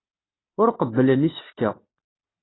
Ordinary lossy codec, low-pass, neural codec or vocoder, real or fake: AAC, 16 kbps; 7.2 kHz; none; real